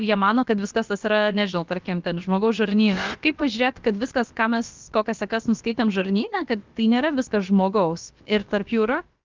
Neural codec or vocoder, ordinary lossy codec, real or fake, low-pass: codec, 16 kHz, about 1 kbps, DyCAST, with the encoder's durations; Opus, 32 kbps; fake; 7.2 kHz